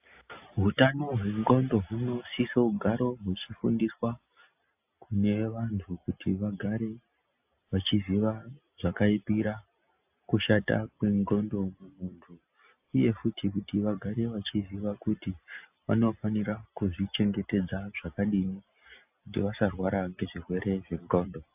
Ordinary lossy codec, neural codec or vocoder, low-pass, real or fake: AAC, 32 kbps; none; 3.6 kHz; real